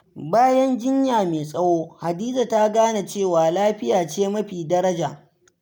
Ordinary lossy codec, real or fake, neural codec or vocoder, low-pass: none; real; none; none